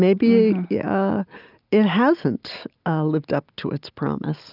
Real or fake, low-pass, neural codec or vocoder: real; 5.4 kHz; none